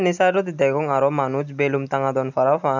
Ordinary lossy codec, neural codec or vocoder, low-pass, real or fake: none; none; 7.2 kHz; real